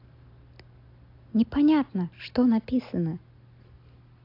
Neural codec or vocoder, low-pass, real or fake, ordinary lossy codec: none; 5.4 kHz; real; AAC, 32 kbps